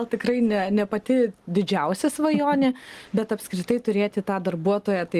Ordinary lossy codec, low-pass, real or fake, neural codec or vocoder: Opus, 24 kbps; 14.4 kHz; real; none